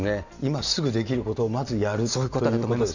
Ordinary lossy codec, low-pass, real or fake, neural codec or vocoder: none; 7.2 kHz; real; none